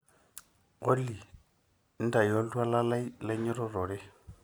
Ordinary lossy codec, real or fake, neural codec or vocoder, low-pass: none; real; none; none